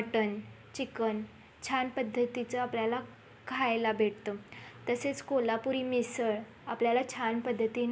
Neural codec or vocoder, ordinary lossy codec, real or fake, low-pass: none; none; real; none